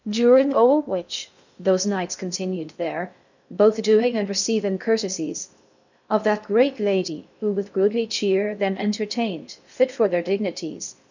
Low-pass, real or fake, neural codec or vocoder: 7.2 kHz; fake; codec, 16 kHz in and 24 kHz out, 0.6 kbps, FocalCodec, streaming, 2048 codes